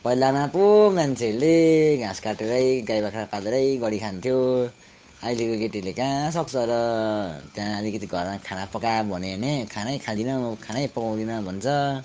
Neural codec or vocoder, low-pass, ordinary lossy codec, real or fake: codec, 16 kHz, 8 kbps, FunCodec, trained on Chinese and English, 25 frames a second; none; none; fake